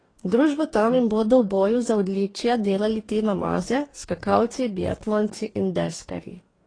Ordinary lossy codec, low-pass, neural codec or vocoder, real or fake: AAC, 32 kbps; 9.9 kHz; codec, 44.1 kHz, 2.6 kbps, DAC; fake